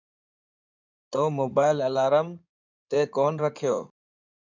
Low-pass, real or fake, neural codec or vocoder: 7.2 kHz; fake; codec, 16 kHz in and 24 kHz out, 2.2 kbps, FireRedTTS-2 codec